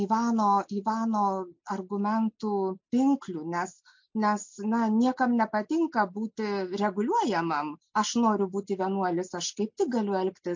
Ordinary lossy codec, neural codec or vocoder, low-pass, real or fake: MP3, 48 kbps; none; 7.2 kHz; real